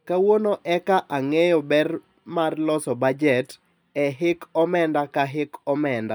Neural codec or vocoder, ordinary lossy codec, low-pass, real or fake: none; none; none; real